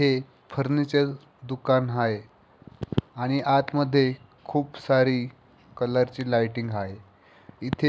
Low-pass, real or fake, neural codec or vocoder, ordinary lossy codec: none; real; none; none